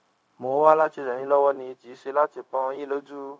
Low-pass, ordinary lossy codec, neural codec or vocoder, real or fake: none; none; codec, 16 kHz, 0.4 kbps, LongCat-Audio-Codec; fake